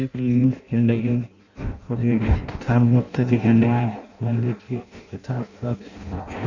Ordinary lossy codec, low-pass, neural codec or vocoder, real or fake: none; 7.2 kHz; codec, 16 kHz in and 24 kHz out, 0.6 kbps, FireRedTTS-2 codec; fake